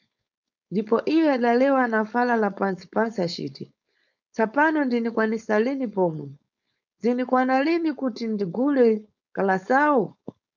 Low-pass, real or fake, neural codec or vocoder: 7.2 kHz; fake; codec, 16 kHz, 4.8 kbps, FACodec